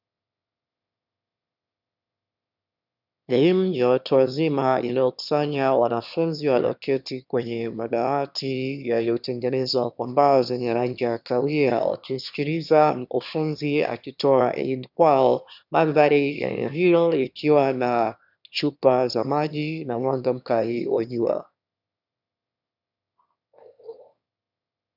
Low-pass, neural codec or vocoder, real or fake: 5.4 kHz; autoencoder, 22.05 kHz, a latent of 192 numbers a frame, VITS, trained on one speaker; fake